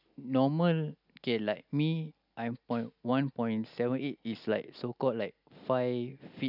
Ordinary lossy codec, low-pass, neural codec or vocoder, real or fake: none; 5.4 kHz; none; real